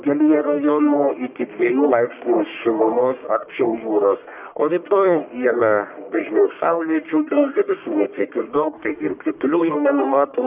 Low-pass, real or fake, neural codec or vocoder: 3.6 kHz; fake; codec, 44.1 kHz, 1.7 kbps, Pupu-Codec